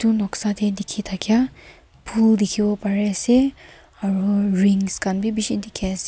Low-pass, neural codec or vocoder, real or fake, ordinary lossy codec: none; none; real; none